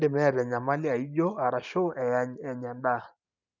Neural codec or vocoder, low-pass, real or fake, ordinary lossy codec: none; 7.2 kHz; real; none